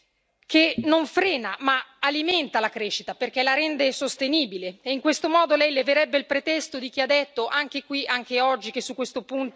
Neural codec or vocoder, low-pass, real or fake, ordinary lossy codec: none; none; real; none